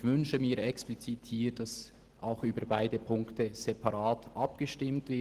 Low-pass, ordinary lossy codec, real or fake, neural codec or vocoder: 14.4 kHz; Opus, 16 kbps; real; none